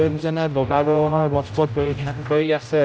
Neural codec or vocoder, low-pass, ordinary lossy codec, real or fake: codec, 16 kHz, 0.5 kbps, X-Codec, HuBERT features, trained on general audio; none; none; fake